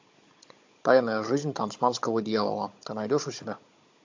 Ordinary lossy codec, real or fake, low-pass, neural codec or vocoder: MP3, 48 kbps; fake; 7.2 kHz; codec, 16 kHz, 16 kbps, FunCodec, trained on Chinese and English, 50 frames a second